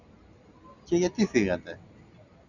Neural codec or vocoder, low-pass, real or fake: none; 7.2 kHz; real